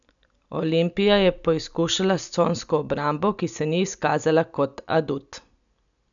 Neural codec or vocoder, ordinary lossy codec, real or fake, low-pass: none; none; real; 7.2 kHz